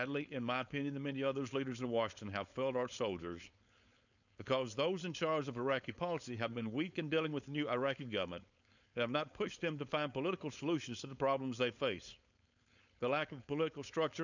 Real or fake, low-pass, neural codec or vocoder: fake; 7.2 kHz; codec, 16 kHz, 4.8 kbps, FACodec